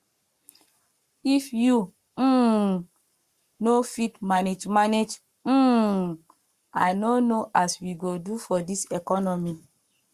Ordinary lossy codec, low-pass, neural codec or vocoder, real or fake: Opus, 64 kbps; 14.4 kHz; codec, 44.1 kHz, 7.8 kbps, Pupu-Codec; fake